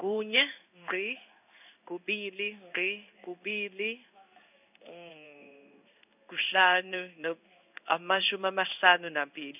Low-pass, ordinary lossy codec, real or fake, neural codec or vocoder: 3.6 kHz; none; fake; codec, 16 kHz in and 24 kHz out, 1 kbps, XY-Tokenizer